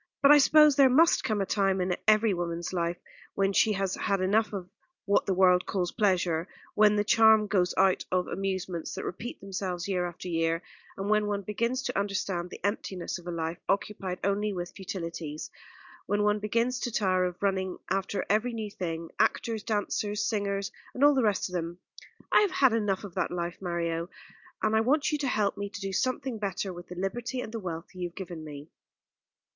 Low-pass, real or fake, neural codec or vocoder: 7.2 kHz; real; none